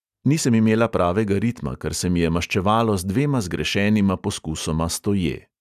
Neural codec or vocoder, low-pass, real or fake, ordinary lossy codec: none; 14.4 kHz; real; none